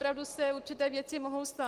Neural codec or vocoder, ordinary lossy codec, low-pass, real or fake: none; Opus, 24 kbps; 14.4 kHz; real